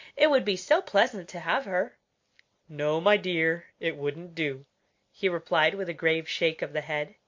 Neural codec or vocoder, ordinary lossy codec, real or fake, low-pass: none; MP3, 48 kbps; real; 7.2 kHz